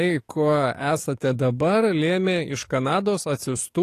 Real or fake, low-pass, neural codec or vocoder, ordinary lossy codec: fake; 14.4 kHz; codec, 44.1 kHz, 7.8 kbps, DAC; AAC, 48 kbps